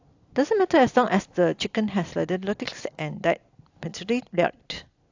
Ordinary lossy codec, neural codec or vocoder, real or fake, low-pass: AAC, 48 kbps; none; real; 7.2 kHz